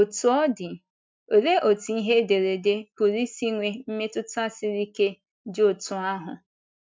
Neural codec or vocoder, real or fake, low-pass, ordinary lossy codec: none; real; none; none